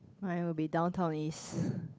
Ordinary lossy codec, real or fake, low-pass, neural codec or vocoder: none; fake; none; codec, 16 kHz, 8 kbps, FunCodec, trained on Chinese and English, 25 frames a second